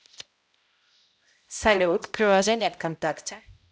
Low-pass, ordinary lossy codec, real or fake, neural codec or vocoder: none; none; fake; codec, 16 kHz, 0.5 kbps, X-Codec, HuBERT features, trained on balanced general audio